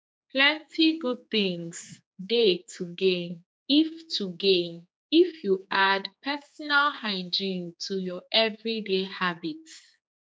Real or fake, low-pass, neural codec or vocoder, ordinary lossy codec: fake; none; codec, 16 kHz, 4 kbps, X-Codec, HuBERT features, trained on general audio; none